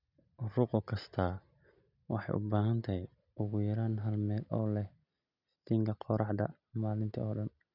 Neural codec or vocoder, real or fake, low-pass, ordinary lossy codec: none; real; 5.4 kHz; none